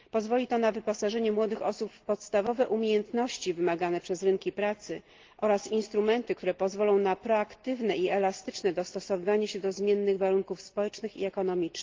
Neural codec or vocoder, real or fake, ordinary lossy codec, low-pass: none; real; Opus, 16 kbps; 7.2 kHz